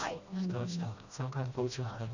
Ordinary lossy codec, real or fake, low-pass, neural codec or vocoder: none; fake; 7.2 kHz; codec, 16 kHz, 1 kbps, FreqCodec, smaller model